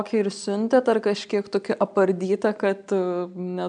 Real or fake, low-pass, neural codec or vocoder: real; 9.9 kHz; none